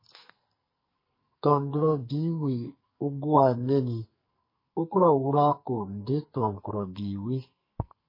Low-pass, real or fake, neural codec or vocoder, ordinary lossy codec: 5.4 kHz; fake; codec, 44.1 kHz, 2.6 kbps, SNAC; MP3, 24 kbps